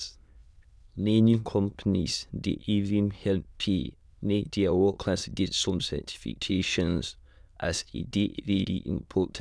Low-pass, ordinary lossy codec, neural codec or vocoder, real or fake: none; none; autoencoder, 22.05 kHz, a latent of 192 numbers a frame, VITS, trained on many speakers; fake